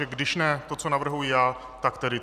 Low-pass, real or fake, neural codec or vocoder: 14.4 kHz; real; none